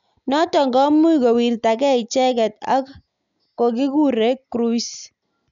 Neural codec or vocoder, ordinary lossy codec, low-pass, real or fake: none; none; 7.2 kHz; real